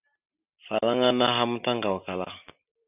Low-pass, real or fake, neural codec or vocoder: 3.6 kHz; real; none